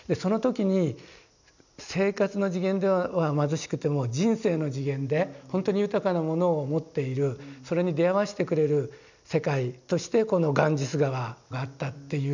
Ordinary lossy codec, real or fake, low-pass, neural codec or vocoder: none; real; 7.2 kHz; none